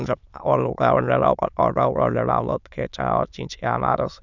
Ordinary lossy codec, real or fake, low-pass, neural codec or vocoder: none; fake; 7.2 kHz; autoencoder, 22.05 kHz, a latent of 192 numbers a frame, VITS, trained on many speakers